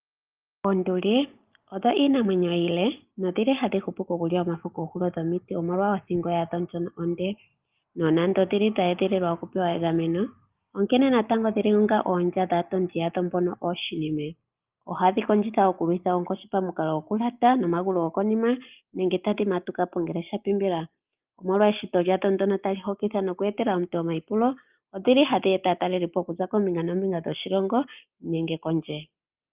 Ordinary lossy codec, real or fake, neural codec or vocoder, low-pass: Opus, 32 kbps; real; none; 3.6 kHz